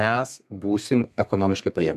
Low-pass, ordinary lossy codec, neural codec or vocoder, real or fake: 14.4 kHz; MP3, 96 kbps; codec, 32 kHz, 1.9 kbps, SNAC; fake